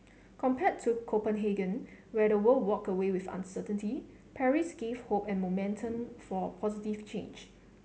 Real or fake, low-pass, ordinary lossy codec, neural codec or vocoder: real; none; none; none